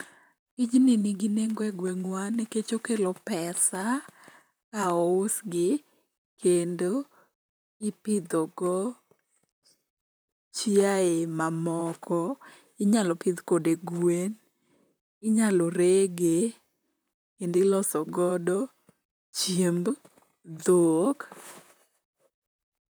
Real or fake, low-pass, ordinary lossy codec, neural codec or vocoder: fake; none; none; vocoder, 44.1 kHz, 128 mel bands, Pupu-Vocoder